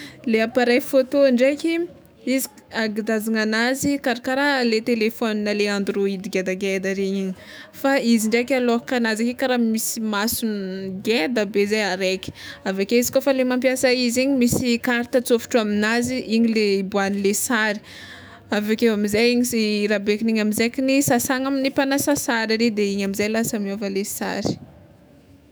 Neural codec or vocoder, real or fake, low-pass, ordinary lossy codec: autoencoder, 48 kHz, 128 numbers a frame, DAC-VAE, trained on Japanese speech; fake; none; none